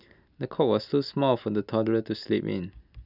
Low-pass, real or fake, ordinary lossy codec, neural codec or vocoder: 5.4 kHz; real; none; none